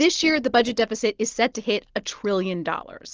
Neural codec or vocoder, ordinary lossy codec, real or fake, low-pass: none; Opus, 16 kbps; real; 7.2 kHz